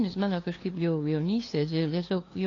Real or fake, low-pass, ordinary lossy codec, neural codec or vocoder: fake; 7.2 kHz; AAC, 32 kbps; codec, 16 kHz, 2 kbps, FunCodec, trained on LibriTTS, 25 frames a second